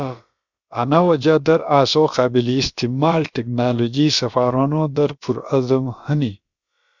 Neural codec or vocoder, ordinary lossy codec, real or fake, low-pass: codec, 16 kHz, about 1 kbps, DyCAST, with the encoder's durations; Opus, 64 kbps; fake; 7.2 kHz